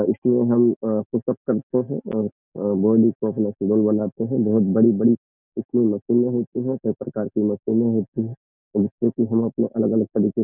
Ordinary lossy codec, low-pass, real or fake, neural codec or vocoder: none; 3.6 kHz; real; none